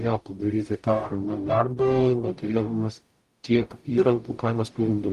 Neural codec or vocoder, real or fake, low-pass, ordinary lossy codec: codec, 44.1 kHz, 0.9 kbps, DAC; fake; 14.4 kHz; Opus, 32 kbps